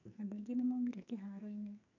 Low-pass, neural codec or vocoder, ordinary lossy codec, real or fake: 7.2 kHz; codec, 44.1 kHz, 2.6 kbps, SNAC; none; fake